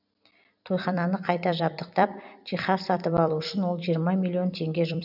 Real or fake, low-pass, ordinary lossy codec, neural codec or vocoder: fake; 5.4 kHz; none; vocoder, 44.1 kHz, 128 mel bands every 256 samples, BigVGAN v2